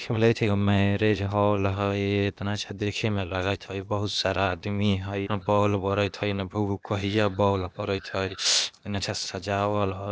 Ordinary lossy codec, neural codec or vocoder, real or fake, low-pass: none; codec, 16 kHz, 0.8 kbps, ZipCodec; fake; none